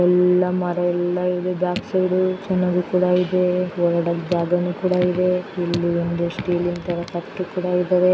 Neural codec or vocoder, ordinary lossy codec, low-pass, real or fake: none; none; none; real